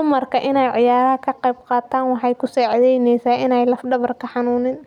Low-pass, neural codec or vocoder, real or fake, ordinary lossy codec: 19.8 kHz; none; real; none